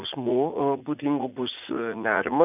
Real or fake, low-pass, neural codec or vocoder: fake; 3.6 kHz; vocoder, 44.1 kHz, 80 mel bands, Vocos